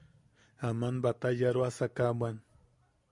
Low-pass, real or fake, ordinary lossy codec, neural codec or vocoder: 10.8 kHz; real; MP3, 96 kbps; none